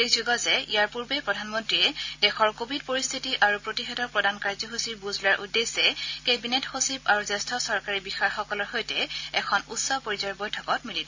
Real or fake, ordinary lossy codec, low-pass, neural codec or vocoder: real; AAC, 48 kbps; 7.2 kHz; none